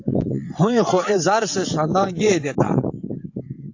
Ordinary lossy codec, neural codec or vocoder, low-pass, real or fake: AAC, 48 kbps; vocoder, 22.05 kHz, 80 mel bands, WaveNeXt; 7.2 kHz; fake